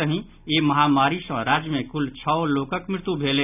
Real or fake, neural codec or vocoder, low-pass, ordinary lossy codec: real; none; 3.6 kHz; none